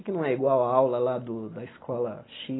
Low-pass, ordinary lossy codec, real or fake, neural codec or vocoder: 7.2 kHz; AAC, 16 kbps; real; none